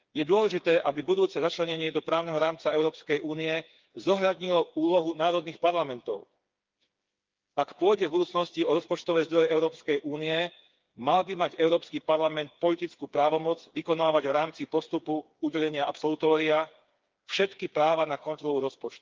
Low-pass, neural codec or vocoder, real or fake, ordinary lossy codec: 7.2 kHz; codec, 16 kHz, 4 kbps, FreqCodec, smaller model; fake; Opus, 24 kbps